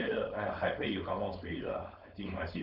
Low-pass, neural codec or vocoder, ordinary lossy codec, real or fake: 5.4 kHz; codec, 16 kHz, 4.8 kbps, FACodec; none; fake